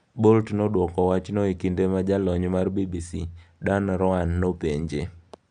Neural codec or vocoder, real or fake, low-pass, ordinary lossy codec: none; real; 9.9 kHz; none